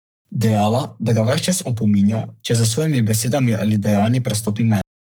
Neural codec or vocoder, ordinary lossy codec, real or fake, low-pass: codec, 44.1 kHz, 3.4 kbps, Pupu-Codec; none; fake; none